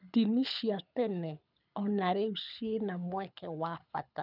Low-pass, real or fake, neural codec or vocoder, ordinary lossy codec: 5.4 kHz; fake; codec, 24 kHz, 6 kbps, HILCodec; none